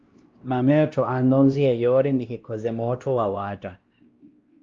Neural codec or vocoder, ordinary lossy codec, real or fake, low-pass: codec, 16 kHz, 1 kbps, X-Codec, WavLM features, trained on Multilingual LibriSpeech; Opus, 32 kbps; fake; 7.2 kHz